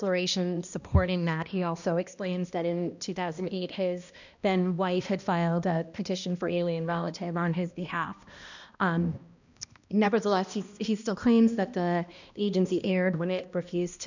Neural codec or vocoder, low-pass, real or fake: codec, 16 kHz, 1 kbps, X-Codec, HuBERT features, trained on balanced general audio; 7.2 kHz; fake